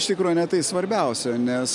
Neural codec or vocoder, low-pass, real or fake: none; 10.8 kHz; real